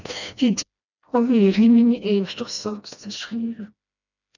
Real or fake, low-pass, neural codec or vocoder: fake; 7.2 kHz; codec, 16 kHz, 1 kbps, FreqCodec, smaller model